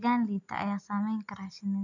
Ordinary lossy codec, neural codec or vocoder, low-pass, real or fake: none; none; 7.2 kHz; real